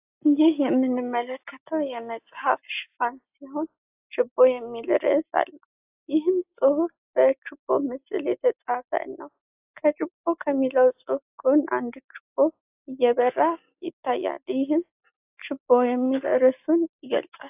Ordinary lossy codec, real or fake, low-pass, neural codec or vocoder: AAC, 32 kbps; real; 3.6 kHz; none